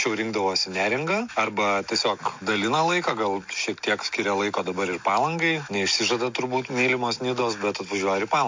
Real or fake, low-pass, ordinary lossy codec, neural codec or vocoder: real; 7.2 kHz; MP3, 48 kbps; none